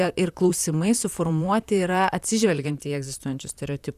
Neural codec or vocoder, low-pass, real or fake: vocoder, 44.1 kHz, 128 mel bands, Pupu-Vocoder; 14.4 kHz; fake